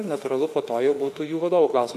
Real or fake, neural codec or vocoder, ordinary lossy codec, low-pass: fake; autoencoder, 48 kHz, 32 numbers a frame, DAC-VAE, trained on Japanese speech; AAC, 96 kbps; 14.4 kHz